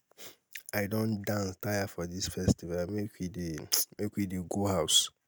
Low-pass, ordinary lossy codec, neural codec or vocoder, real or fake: none; none; none; real